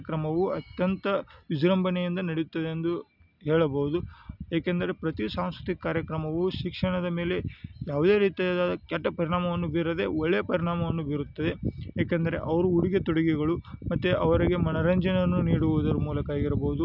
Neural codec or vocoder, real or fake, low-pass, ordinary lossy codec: none; real; 5.4 kHz; none